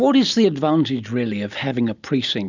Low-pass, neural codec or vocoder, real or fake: 7.2 kHz; none; real